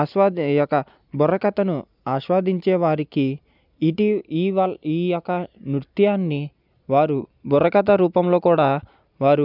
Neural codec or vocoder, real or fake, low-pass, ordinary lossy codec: none; real; 5.4 kHz; none